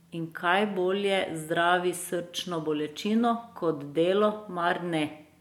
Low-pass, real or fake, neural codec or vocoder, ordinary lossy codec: 19.8 kHz; real; none; MP3, 96 kbps